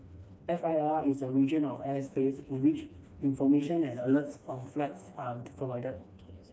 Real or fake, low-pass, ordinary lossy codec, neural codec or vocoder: fake; none; none; codec, 16 kHz, 2 kbps, FreqCodec, smaller model